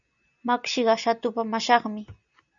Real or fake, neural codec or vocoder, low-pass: real; none; 7.2 kHz